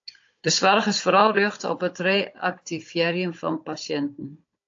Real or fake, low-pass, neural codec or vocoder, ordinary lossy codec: fake; 7.2 kHz; codec, 16 kHz, 16 kbps, FunCodec, trained on Chinese and English, 50 frames a second; AAC, 48 kbps